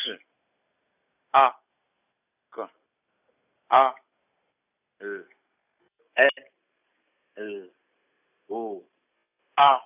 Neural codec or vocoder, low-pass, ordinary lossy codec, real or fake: none; 3.6 kHz; none; real